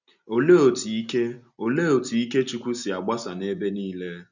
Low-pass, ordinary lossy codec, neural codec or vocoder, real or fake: 7.2 kHz; none; none; real